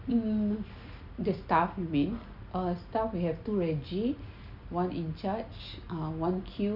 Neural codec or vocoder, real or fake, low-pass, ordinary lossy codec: none; real; 5.4 kHz; none